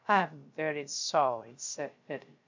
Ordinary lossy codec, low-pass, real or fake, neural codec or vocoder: MP3, 64 kbps; 7.2 kHz; fake; codec, 16 kHz, 0.3 kbps, FocalCodec